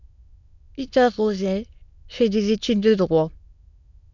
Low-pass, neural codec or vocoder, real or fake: 7.2 kHz; autoencoder, 22.05 kHz, a latent of 192 numbers a frame, VITS, trained on many speakers; fake